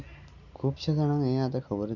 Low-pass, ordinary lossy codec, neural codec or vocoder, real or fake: 7.2 kHz; none; none; real